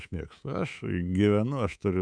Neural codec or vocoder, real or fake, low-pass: codec, 24 kHz, 3.1 kbps, DualCodec; fake; 9.9 kHz